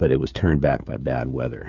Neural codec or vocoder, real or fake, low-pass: codec, 16 kHz, 16 kbps, FreqCodec, smaller model; fake; 7.2 kHz